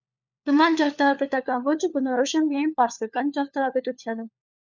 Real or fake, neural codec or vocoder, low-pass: fake; codec, 16 kHz, 4 kbps, FunCodec, trained on LibriTTS, 50 frames a second; 7.2 kHz